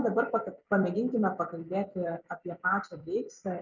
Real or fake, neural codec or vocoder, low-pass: fake; vocoder, 44.1 kHz, 128 mel bands every 256 samples, BigVGAN v2; 7.2 kHz